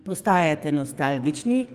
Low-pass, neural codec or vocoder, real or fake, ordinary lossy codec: 14.4 kHz; codec, 44.1 kHz, 2.6 kbps, SNAC; fake; Opus, 32 kbps